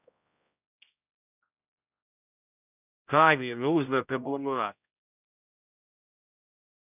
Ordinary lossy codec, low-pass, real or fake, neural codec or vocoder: none; 3.6 kHz; fake; codec, 16 kHz, 0.5 kbps, X-Codec, HuBERT features, trained on general audio